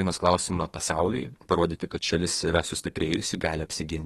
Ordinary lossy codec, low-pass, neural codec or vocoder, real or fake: AAC, 32 kbps; 14.4 kHz; codec, 32 kHz, 1.9 kbps, SNAC; fake